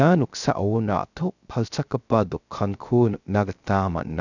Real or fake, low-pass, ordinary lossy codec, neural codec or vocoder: fake; 7.2 kHz; none; codec, 16 kHz, 0.3 kbps, FocalCodec